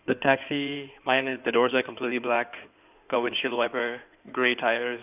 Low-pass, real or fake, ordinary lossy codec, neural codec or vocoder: 3.6 kHz; fake; none; codec, 16 kHz in and 24 kHz out, 2.2 kbps, FireRedTTS-2 codec